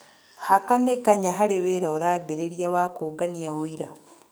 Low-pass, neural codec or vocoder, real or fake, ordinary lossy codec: none; codec, 44.1 kHz, 2.6 kbps, SNAC; fake; none